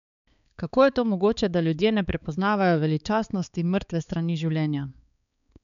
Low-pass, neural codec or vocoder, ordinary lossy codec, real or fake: 7.2 kHz; codec, 16 kHz, 4 kbps, X-Codec, HuBERT features, trained on balanced general audio; none; fake